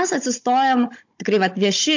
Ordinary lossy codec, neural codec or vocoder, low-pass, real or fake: MP3, 48 kbps; codec, 16 kHz, 16 kbps, FunCodec, trained on Chinese and English, 50 frames a second; 7.2 kHz; fake